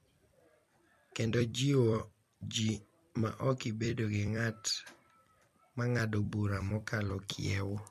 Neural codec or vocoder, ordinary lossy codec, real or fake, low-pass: vocoder, 44.1 kHz, 128 mel bands every 256 samples, BigVGAN v2; MP3, 64 kbps; fake; 14.4 kHz